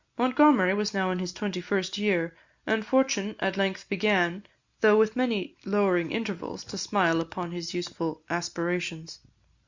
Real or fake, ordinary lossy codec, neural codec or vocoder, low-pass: real; Opus, 64 kbps; none; 7.2 kHz